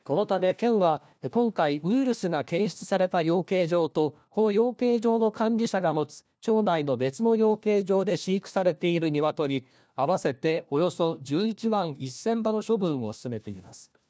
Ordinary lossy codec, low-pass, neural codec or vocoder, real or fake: none; none; codec, 16 kHz, 1 kbps, FunCodec, trained on LibriTTS, 50 frames a second; fake